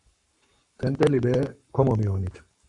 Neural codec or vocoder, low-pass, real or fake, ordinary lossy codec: codec, 44.1 kHz, 7.8 kbps, Pupu-Codec; 10.8 kHz; fake; AAC, 64 kbps